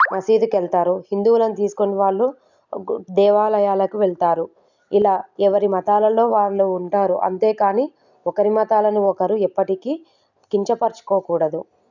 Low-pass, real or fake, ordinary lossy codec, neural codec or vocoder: 7.2 kHz; real; none; none